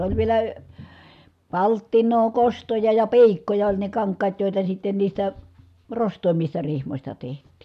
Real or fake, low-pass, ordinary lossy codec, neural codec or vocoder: real; 14.4 kHz; none; none